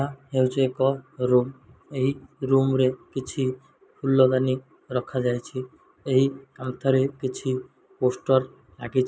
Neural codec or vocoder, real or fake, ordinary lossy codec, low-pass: none; real; none; none